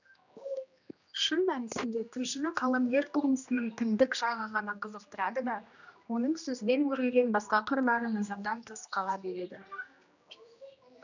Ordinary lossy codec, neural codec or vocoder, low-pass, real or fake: none; codec, 16 kHz, 1 kbps, X-Codec, HuBERT features, trained on general audio; 7.2 kHz; fake